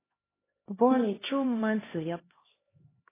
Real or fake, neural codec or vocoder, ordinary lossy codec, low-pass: fake; codec, 16 kHz, 1 kbps, X-Codec, HuBERT features, trained on LibriSpeech; AAC, 16 kbps; 3.6 kHz